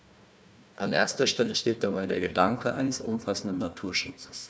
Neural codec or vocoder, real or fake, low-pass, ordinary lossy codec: codec, 16 kHz, 1 kbps, FunCodec, trained on Chinese and English, 50 frames a second; fake; none; none